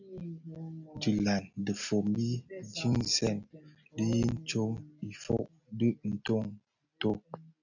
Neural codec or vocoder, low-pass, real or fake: none; 7.2 kHz; real